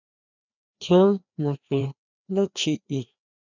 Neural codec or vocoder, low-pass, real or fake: codec, 16 kHz, 2 kbps, FreqCodec, larger model; 7.2 kHz; fake